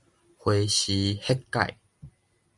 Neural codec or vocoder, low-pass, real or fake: none; 10.8 kHz; real